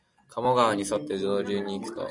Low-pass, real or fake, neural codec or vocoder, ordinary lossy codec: 10.8 kHz; real; none; MP3, 96 kbps